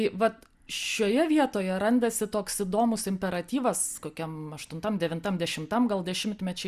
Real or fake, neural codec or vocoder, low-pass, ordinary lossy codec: real; none; 14.4 kHz; Opus, 64 kbps